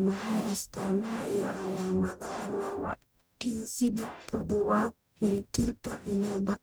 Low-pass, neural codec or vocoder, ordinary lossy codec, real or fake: none; codec, 44.1 kHz, 0.9 kbps, DAC; none; fake